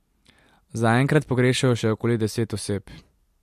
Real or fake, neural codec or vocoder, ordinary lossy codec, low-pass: real; none; MP3, 64 kbps; 14.4 kHz